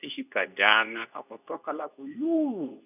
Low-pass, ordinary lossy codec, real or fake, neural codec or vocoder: 3.6 kHz; none; fake; codec, 24 kHz, 0.9 kbps, WavTokenizer, medium speech release version 2